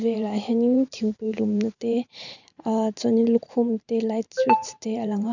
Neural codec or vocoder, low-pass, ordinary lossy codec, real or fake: vocoder, 44.1 kHz, 128 mel bands every 512 samples, BigVGAN v2; 7.2 kHz; none; fake